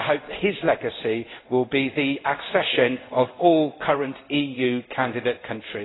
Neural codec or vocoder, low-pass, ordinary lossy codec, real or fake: codec, 16 kHz in and 24 kHz out, 1 kbps, XY-Tokenizer; 7.2 kHz; AAC, 16 kbps; fake